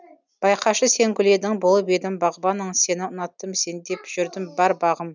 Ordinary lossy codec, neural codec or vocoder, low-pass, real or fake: none; none; 7.2 kHz; real